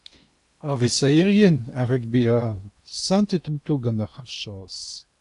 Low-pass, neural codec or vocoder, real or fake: 10.8 kHz; codec, 16 kHz in and 24 kHz out, 0.8 kbps, FocalCodec, streaming, 65536 codes; fake